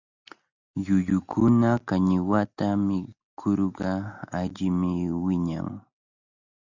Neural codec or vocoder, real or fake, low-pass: none; real; 7.2 kHz